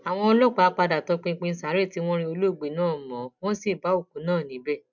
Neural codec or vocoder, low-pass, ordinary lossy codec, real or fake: none; 7.2 kHz; none; real